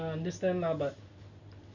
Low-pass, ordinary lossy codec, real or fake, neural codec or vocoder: 7.2 kHz; none; real; none